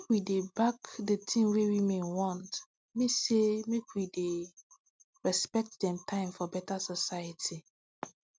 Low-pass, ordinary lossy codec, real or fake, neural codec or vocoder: none; none; real; none